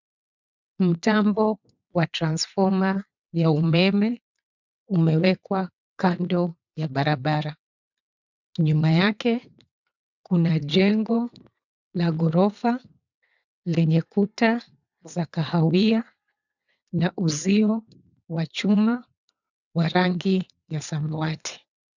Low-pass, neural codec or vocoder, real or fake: 7.2 kHz; vocoder, 22.05 kHz, 80 mel bands, WaveNeXt; fake